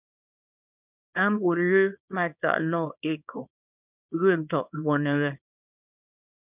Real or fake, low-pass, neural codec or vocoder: fake; 3.6 kHz; codec, 24 kHz, 0.9 kbps, WavTokenizer, small release